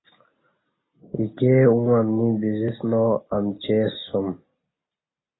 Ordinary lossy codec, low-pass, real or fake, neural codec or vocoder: AAC, 16 kbps; 7.2 kHz; real; none